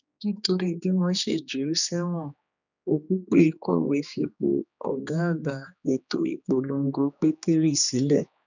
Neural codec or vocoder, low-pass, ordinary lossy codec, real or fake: codec, 16 kHz, 2 kbps, X-Codec, HuBERT features, trained on general audio; 7.2 kHz; none; fake